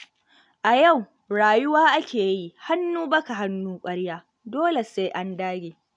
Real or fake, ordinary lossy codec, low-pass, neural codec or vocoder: real; none; 9.9 kHz; none